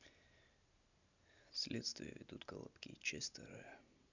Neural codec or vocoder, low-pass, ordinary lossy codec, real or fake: none; 7.2 kHz; none; real